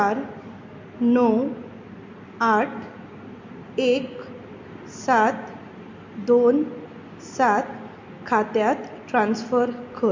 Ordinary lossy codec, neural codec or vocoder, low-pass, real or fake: MP3, 48 kbps; none; 7.2 kHz; real